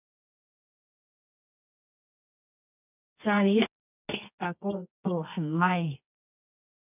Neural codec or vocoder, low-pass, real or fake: codec, 24 kHz, 0.9 kbps, WavTokenizer, medium music audio release; 3.6 kHz; fake